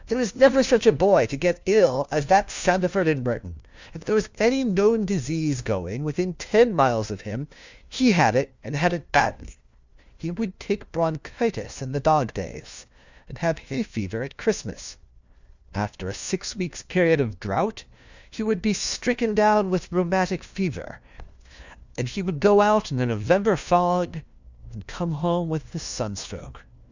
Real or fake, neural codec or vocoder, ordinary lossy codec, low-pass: fake; codec, 16 kHz, 1 kbps, FunCodec, trained on LibriTTS, 50 frames a second; Opus, 64 kbps; 7.2 kHz